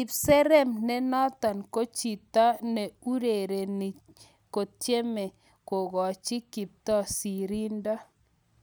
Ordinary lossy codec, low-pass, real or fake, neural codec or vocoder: none; none; real; none